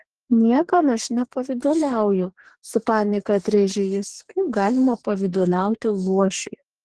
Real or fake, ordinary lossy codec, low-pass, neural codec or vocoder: fake; Opus, 16 kbps; 10.8 kHz; codec, 44.1 kHz, 2.6 kbps, DAC